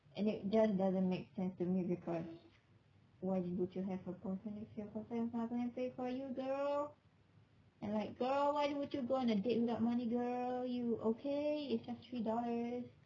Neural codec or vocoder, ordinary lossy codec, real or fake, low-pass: none; none; real; 7.2 kHz